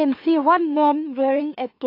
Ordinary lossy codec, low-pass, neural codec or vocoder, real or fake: AAC, 32 kbps; 5.4 kHz; autoencoder, 44.1 kHz, a latent of 192 numbers a frame, MeloTTS; fake